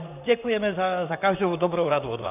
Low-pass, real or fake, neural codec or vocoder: 3.6 kHz; fake; vocoder, 44.1 kHz, 128 mel bands, Pupu-Vocoder